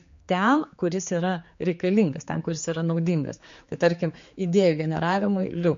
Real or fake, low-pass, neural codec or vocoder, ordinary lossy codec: fake; 7.2 kHz; codec, 16 kHz, 4 kbps, X-Codec, HuBERT features, trained on general audio; MP3, 48 kbps